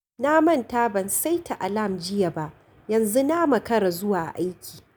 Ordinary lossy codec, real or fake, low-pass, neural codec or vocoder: none; real; none; none